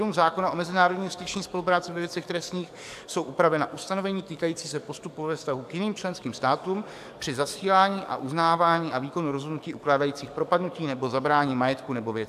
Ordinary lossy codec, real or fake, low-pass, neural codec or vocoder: AAC, 96 kbps; fake; 14.4 kHz; codec, 44.1 kHz, 7.8 kbps, DAC